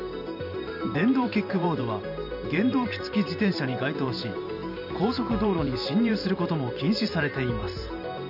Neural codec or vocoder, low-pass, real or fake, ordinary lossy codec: vocoder, 44.1 kHz, 128 mel bands every 512 samples, BigVGAN v2; 5.4 kHz; fake; none